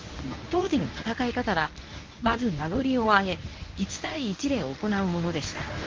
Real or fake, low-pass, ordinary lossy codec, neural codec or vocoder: fake; 7.2 kHz; Opus, 24 kbps; codec, 24 kHz, 0.9 kbps, WavTokenizer, medium speech release version 1